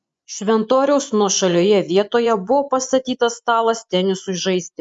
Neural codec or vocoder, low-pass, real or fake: vocoder, 24 kHz, 100 mel bands, Vocos; 10.8 kHz; fake